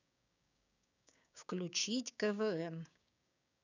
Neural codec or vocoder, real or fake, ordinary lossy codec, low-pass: codec, 16 kHz, 6 kbps, DAC; fake; none; 7.2 kHz